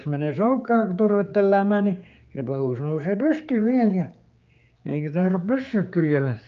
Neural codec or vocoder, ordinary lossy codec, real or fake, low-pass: codec, 16 kHz, 4 kbps, X-Codec, HuBERT features, trained on general audio; Opus, 24 kbps; fake; 7.2 kHz